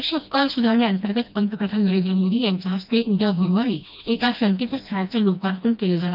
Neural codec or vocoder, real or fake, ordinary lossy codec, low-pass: codec, 16 kHz, 1 kbps, FreqCodec, smaller model; fake; none; 5.4 kHz